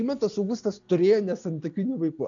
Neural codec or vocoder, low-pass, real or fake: none; 7.2 kHz; real